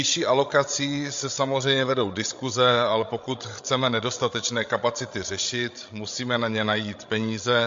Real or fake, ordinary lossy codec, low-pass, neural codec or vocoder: fake; MP3, 48 kbps; 7.2 kHz; codec, 16 kHz, 16 kbps, FreqCodec, larger model